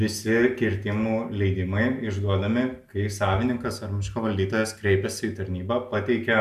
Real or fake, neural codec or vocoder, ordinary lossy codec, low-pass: real; none; AAC, 96 kbps; 14.4 kHz